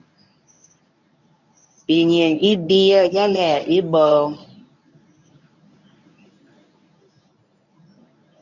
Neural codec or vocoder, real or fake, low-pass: codec, 24 kHz, 0.9 kbps, WavTokenizer, medium speech release version 1; fake; 7.2 kHz